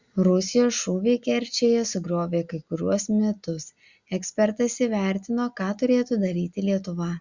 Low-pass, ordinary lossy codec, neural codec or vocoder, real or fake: 7.2 kHz; Opus, 64 kbps; none; real